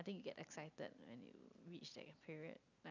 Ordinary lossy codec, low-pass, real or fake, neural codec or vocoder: none; 7.2 kHz; real; none